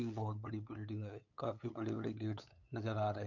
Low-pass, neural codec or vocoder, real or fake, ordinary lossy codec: 7.2 kHz; codec, 16 kHz, 8 kbps, FunCodec, trained on LibriTTS, 25 frames a second; fake; none